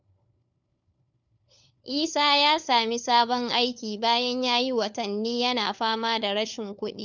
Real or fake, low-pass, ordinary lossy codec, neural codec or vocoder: fake; 7.2 kHz; none; codec, 16 kHz, 4 kbps, FunCodec, trained on LibriTTS, 50 frames a second